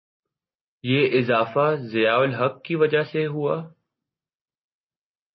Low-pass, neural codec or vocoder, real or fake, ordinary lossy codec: 7.2 kHz; none; real; MP3, 24 kbps